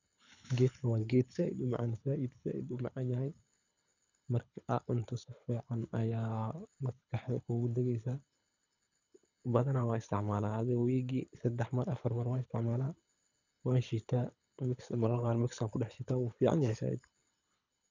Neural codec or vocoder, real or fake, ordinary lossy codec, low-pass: codec, 24 kHz, 6 kbps, HILCodec; fake; none; 7.2 kHz